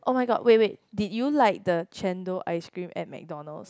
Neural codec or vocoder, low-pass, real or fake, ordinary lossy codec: none; none; real; none